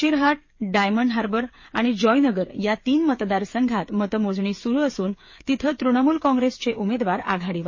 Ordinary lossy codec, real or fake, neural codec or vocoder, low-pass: MP3, 32 kbps; fake; codec, 16 kHz, 8 kbps, FreqCodec, smaller model; 7.2 kHz